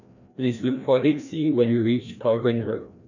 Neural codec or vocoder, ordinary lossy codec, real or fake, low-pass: codec, 16 kHz, 1 kbps, FreqCodec, larger model; none; fake; 7.2 kHz